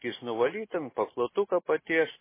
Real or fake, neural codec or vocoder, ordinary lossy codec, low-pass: real; none; MP3, 16 kbps; 3.6 kHz